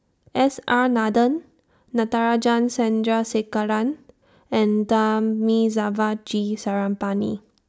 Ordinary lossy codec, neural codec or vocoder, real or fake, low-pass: none; none; real; none